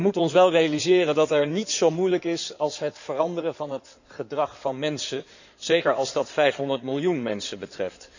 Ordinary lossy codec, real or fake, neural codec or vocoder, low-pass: none; fake; codec, 16 kHz in and 24 kHz out, 2.2 kbps, FireRedTTS-2 codec; 7.2 kHz